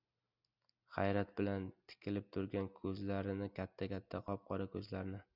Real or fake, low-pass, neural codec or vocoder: real; 5.4 kHz; none